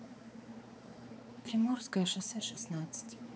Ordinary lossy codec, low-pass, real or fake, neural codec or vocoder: none; none; fake; codec, 16 kHz, 4 kbps, X-Codec, HuBERT features, trained on balanced general audio